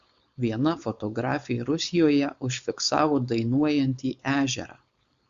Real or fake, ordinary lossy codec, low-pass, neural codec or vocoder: fake; Opus, 64 kbps; 7.2 kHz; codec, 16 kHz, 4.8 kbps, FACodec